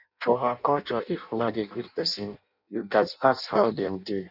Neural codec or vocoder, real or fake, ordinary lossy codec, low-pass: codec, 16 kHz in and 24 kHz out, 0.6 kbps, FireRedTTS-2 codec; fake; none; 5.4 kHz